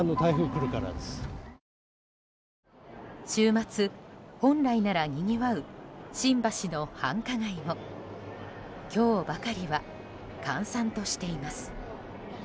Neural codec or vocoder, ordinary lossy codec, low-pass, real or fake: none; none; none; real